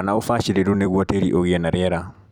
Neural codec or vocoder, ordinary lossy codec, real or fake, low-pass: vocoder, 44.1 kHz, 128 mel bands every 256 samples, BigVGAN v2; none; fake; 19.8 kHz